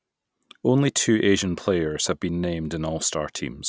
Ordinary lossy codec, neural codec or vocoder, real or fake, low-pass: none; none; real; none